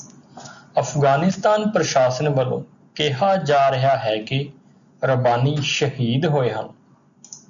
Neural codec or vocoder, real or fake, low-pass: none; real; 7.2 kHz